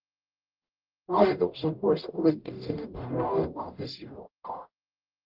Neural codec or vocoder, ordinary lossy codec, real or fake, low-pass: codec, 44.1 kHz, 0.9 kbps, DAC; Opus, 32 kbps; fake; 5.4 kHz